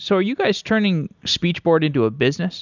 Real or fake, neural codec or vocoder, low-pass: real; none; 7.2 kHz